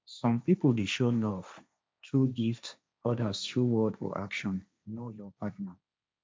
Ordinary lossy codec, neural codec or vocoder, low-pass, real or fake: none; codec, 16 kHz, 1.1 kbps, Voila-Tokenizer; none; fake